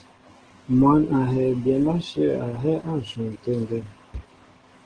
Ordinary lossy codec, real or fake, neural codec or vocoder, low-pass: Opus, 16 kbps; fake; codec, 44.1 kHz, 7.8 kbps, DAC; 9.9 kHz